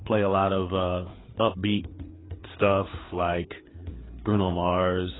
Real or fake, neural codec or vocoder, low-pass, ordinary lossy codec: fake; codec, 16 kHz, 4 kbps, FreqCodec, larger model; 7.2 kHz; AAC, 16 kbps